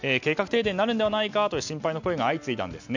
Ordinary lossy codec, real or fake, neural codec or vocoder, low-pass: none; real; none; 7.2 kHz